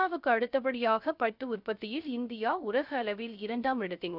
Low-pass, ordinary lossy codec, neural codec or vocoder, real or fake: 5.4 kHz; none; codec, 16 kHz, 0.3 kbps, FocalCodec; fake